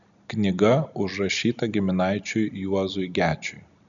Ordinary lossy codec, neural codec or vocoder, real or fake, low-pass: MP3, 96 kbps; none; real; 7.2 kHz